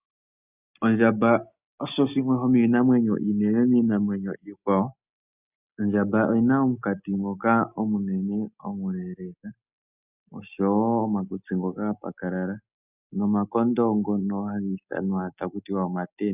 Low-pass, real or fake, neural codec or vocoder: 3.6 kHz; real; none